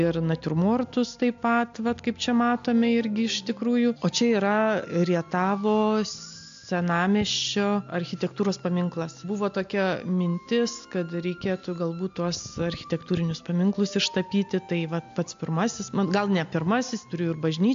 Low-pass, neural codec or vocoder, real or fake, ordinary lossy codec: 7.2 kHz; none; real; AAC, 64 kbps